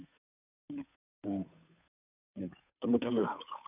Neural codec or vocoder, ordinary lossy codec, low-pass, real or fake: codec, 16 kHz, 2 kbps, FunCodec, trained on Chinese and English, 25 frames a second; none; 3.6 kHz; fake